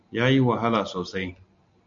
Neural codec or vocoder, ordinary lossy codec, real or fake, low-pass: none; MP3, 64 kbps; real; 7.2 kHz